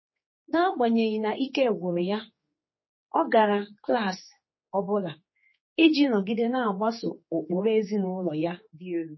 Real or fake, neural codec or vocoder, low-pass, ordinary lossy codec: fake; codec, 16 kHz, 4 kbps, X-Codec, HuBERT features, trained on general audio; 7.2 kHz; MP3, 24 kbps